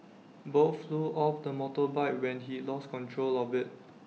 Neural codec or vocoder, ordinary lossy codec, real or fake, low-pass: none; none; real; none